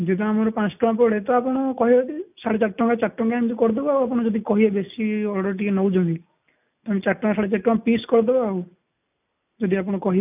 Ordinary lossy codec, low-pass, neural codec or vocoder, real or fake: none; 3.6 kHz; none; real